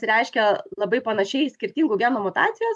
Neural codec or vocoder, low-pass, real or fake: none; 10.8 kHz; real